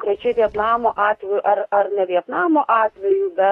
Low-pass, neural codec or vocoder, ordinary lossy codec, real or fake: 19.8 kHz; autoencoder, 48 kHz, 32 numbers a frame, DAC-VAE, trained on Japanese speech; AAC, 32 kbps; fake